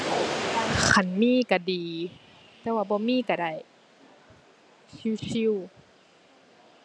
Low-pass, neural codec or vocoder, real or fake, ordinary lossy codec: none; none; real; none